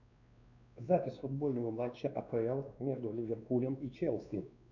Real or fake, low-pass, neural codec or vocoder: fake; 7.2 kHz; codec, 16 kHz, 2 kbps, X-Codec, WavLM features, trained on Multilingual LibriSpeech